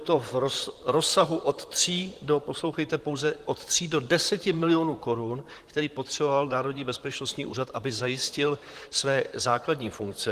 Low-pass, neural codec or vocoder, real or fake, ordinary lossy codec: 14.4 kHz; vocoder, 44.1 kHz, 128 mel bands, Pupu-Vocoder; fake; Opus, 24 kbps